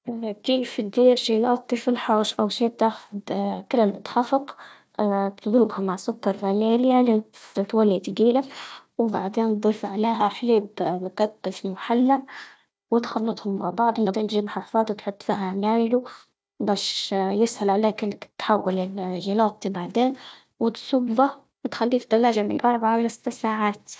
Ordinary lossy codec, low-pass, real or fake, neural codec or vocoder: none; none; fake; codec, 16 kHz, 1 kbps, FunCodec, trained on Chinese and English, 50 frames a second